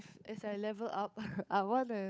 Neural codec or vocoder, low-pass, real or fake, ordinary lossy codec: codec, 16 kHz, 8 kbps, FunCodec, trained on Chinese and English, 25 frames a second; none; fake; none